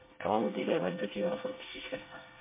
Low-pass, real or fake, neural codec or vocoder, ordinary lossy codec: 3.6 kHz; fake; codec, 24 kHz, 1 kbps, SNAC; MP3, 24 kbps